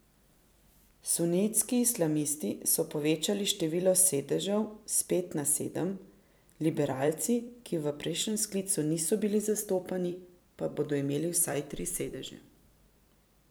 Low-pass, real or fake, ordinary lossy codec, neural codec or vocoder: none; fake; none; vocoder, 44.1 kHz, 128 mel bands every 256 samples, BigVGAN v2